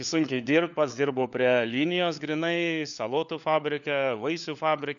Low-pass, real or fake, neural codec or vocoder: 7.2 kHz; fake; codec, 16 kHz, 4 kbps, FunCodec, trained on LibriTTS, 50 frames a second